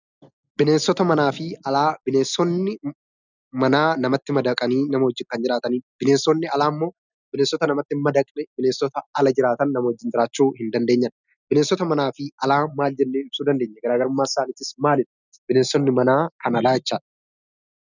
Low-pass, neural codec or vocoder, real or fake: 7.2 kHz; none; real